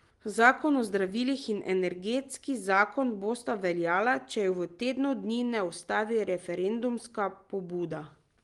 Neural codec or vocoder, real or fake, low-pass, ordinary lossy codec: none; real; 10.8 kHz; Opus, 24 kbps